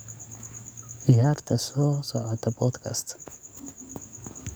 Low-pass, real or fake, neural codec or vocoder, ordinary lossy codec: none; fake; codec, 44.1 kHz, 7.8 kbps, DAC; none